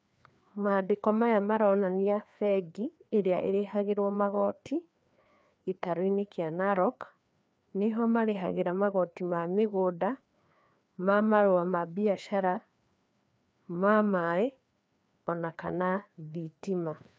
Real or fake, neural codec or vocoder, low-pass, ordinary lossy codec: fake; codec, 16 kHz, 2 kbps, FreqCodec, larger model; none; none